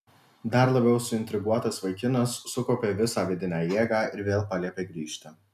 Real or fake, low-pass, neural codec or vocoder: real; 14.4 kHz; none